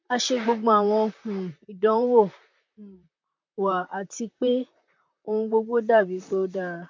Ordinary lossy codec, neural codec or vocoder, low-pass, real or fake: MP3, 48 kbps; vocoder, 44.1 kHz, 128 mel bands, Pupu-Vocoder; 7.2 kHz; fake